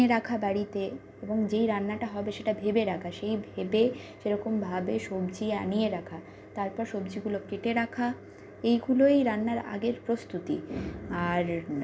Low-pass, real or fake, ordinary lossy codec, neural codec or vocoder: none; real; none; none